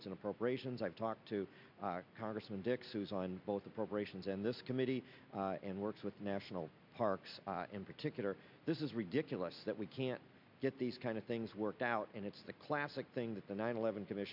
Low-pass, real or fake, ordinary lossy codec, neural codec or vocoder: 5.4 kHz; real; MP3, 48 kbps; none